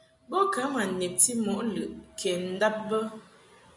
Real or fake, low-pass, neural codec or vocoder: real; 10.8 kHz; none